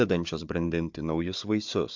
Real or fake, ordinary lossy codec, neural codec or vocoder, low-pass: fake; MP3, 64 kbps; codec, 16 kHz, 4 kbps, FunCodec, trained on Chinese and English, 50 frames a second; 7.2 kHz